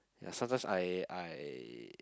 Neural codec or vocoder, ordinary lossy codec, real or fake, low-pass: none; none; real; none